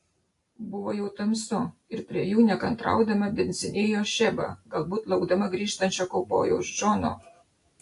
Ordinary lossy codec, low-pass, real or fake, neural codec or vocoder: AAC, 48 kbps; 10.8 kHz; real; none